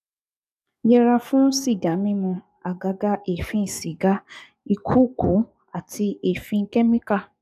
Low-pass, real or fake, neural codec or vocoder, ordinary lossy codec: 14.4 kHz; fake; codec, 44.1 kHz, 7.8 kbps, DAC; none